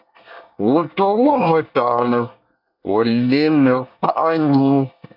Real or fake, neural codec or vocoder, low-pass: fake; codec, 24 kHz, 1 kbps, SNAC; 5.4 kHz